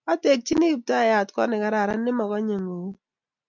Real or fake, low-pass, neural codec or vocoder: real; 7.2 kHz; none